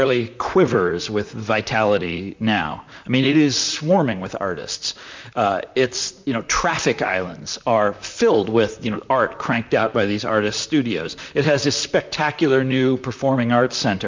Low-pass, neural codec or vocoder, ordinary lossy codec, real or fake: 7.2 kHz; vocoder, 44.1 kHz, 128 mel bands, Pupu-Vocoder; MP3, 64 kbps; fake